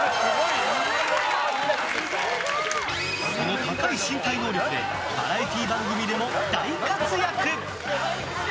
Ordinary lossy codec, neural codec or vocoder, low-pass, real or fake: none; none; none; real